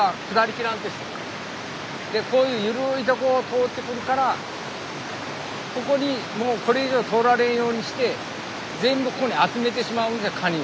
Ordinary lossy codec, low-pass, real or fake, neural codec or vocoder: none; none; real; none